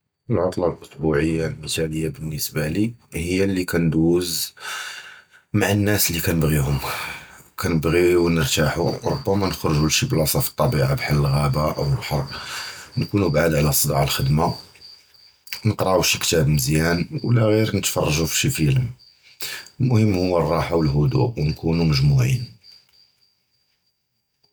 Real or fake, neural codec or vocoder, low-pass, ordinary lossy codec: real; none; none; none